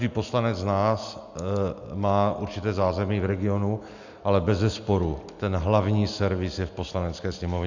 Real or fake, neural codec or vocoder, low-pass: real; none; 7.2 kHz